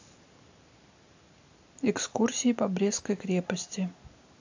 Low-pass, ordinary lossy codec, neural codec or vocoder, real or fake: 7.2 kHz; AAC, 48 kbps; none; real